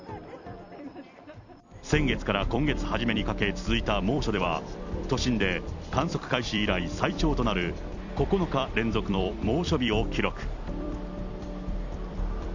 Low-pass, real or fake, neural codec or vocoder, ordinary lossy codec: 7.2 kHz; fake; vocoder, 44.1 kHz, 128 mel bands every 256 samples, BigVGAN v2; none